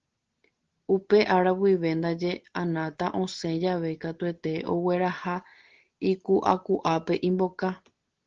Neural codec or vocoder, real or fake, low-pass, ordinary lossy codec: none; real; 7.2 kHz; Opus, 16 kbps